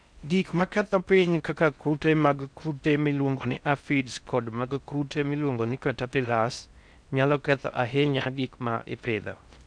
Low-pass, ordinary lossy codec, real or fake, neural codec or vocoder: 9.9 kHz; none; fake; codec, 16 kHz in and 24 kHz out, 0.6 kbps, FocalCodec, streaming, 4096 codes